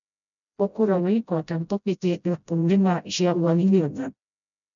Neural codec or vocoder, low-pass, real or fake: codec, 16 kHz, 0.5 kbps, FreqCodec, smaller model; 7.2 kHz; fake